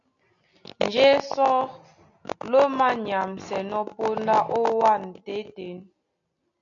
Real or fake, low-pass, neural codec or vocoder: real; 7.2 kHz; none